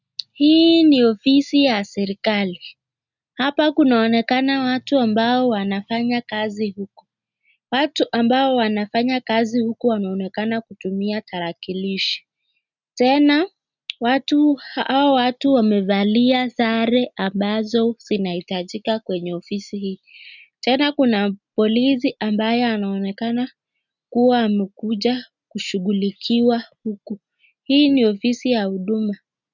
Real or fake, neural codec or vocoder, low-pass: real; none; 7.2 kHz